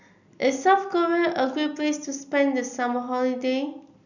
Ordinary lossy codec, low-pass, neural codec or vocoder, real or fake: none; 7.2 kHz; none; real